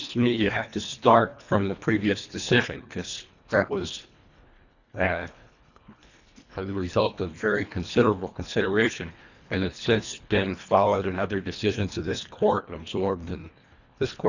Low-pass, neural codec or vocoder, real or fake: 7.2 kHz; codec, 24 kHz, 1.5 kbps, HILCodec; fake